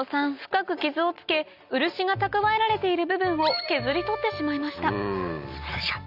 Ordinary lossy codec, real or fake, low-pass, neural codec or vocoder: none; real; 5.4 kHz; none